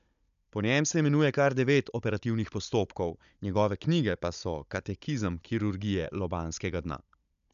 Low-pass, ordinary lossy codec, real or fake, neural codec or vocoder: 7.2 kHz; none; fake; codec, 16 kHz, 16 kbps, FunCodec, trained on Chinese and English, 50 frames a second